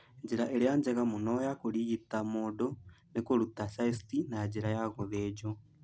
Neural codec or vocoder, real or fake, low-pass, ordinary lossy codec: none; real; none; none